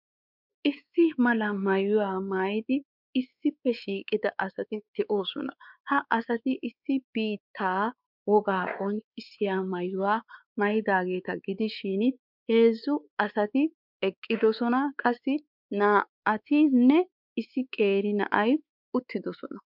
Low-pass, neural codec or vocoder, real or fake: 5.4 kHz; codec, 16 kHz, 4 kbps, X-Codec, WavLM features, trained on Multilingual LibriSpeech; fake